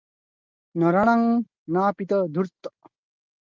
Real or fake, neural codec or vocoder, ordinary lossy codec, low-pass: real; none; Opus, 32 kbps; 7.2 kHz